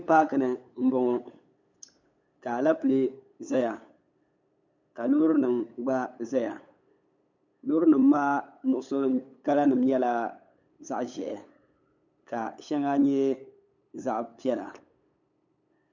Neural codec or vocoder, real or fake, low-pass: codec, 16 kHz, 8 kbps, FunCodec, trained on LibriTTS, 25 frames a second; fake; 7.2 kHz